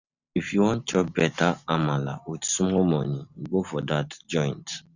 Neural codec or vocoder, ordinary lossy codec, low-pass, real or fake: none; none; 7.2 kHz; real